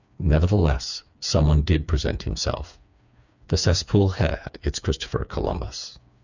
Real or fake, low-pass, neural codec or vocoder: fake; 7.2 kHz; codec, 16 kHz, 4 kbps, FreqCodec, smaller model